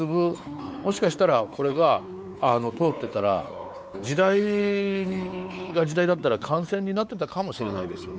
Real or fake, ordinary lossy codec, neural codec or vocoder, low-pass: fake; none; codec, 16 kHz, 4 kbps, X-Codec, WavLM features, trained on Multilingual LibriSpeech; none